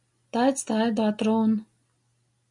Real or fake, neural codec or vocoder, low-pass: real; none; 10.8 kHz